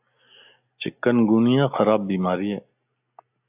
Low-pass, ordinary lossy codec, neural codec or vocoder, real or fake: 3.6 kHz; AAC, 32 kbps; none; real